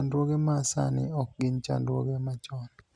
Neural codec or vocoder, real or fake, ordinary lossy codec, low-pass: none; real; none; 9.9 kHz